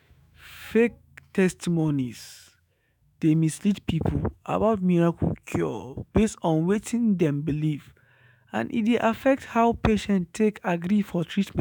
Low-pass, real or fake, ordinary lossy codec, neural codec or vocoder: 19.8 kHz; fake; none; autoencoder, 48 kHz, 128 numbers a frame, DAC-VAE, trained on Japanese speech